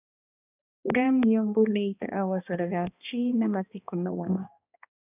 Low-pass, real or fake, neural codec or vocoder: 3.6 kHz; fake; codec, 16 kHz, 1 kbps, X-Codec, HuBERT features, trained on balanced general audio